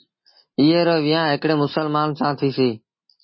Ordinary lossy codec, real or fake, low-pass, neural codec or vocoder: MP3, 24 kbps; real; 7.2 kHz; none